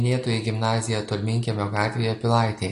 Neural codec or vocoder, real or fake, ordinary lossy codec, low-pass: none; real; AAC, 48 kbps; 10.8 kHz